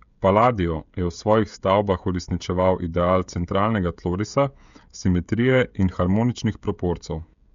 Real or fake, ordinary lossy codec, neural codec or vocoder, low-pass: fake; MP3, 64 kbps; codec, 16 kHz, 16 kbps, FreqCodec, smaller model; 7.2 kHz